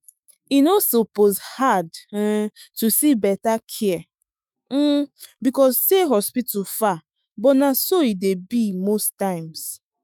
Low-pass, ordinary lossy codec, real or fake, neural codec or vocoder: none; none; fake; autoencoder, 48 kHz, 128 numbers a frame, DAC-VAE, trained on Japanese speech